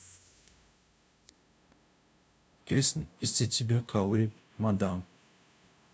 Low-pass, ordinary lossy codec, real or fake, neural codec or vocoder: none; none; fake; codec, 16 kHz, 0.5 kbps, FunCodec, trained on LibriTTS, 25 frames a second